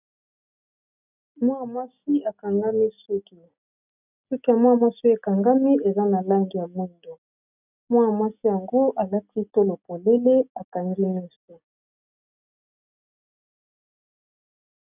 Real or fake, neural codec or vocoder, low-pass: real; none; 3.6 kHz